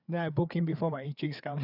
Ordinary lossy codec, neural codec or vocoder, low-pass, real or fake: none; codec, 16 kHz, 4 kbps, FreqCodec, larger model; 5.4 kHz; fake